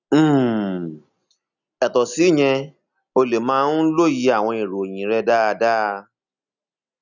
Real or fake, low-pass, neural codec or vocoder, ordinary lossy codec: real; 7.2 kHz; none; none